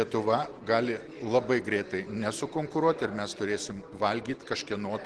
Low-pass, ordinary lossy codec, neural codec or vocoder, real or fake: 10.8 kHz; Opus, 16 kbps; none; real